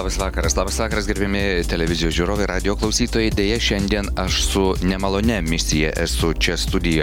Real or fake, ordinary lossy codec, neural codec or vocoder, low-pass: real; MP3, 96 kbps; none; 19.8 kHz